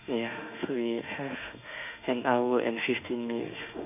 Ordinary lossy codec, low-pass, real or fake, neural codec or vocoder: none; 3.6 kHz; fake; autoencoder, 48 kHz, 32 numbers a frame, DAC-VAE, trained on Japanese speech